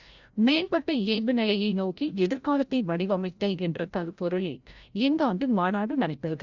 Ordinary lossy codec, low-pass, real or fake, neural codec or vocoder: Opus, 64 kbps; 7.2 kHz; fake; codec, 16 kHz, 0.5 kbps, FreqCodec, larger model